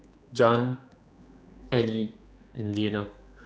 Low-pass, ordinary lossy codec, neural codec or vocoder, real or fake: none; none; codec, 16 kHz, 2 kbps, X-Codec, HuBERT features, trained on general audio; fake